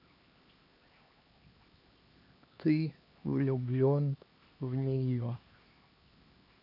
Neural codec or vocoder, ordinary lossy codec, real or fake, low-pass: codec, 16 kHz, 2 kbps, X-Codec, HuBERT features, trained on LibriSpeech; AAC, 48 kbps; fake; 5.4 kHz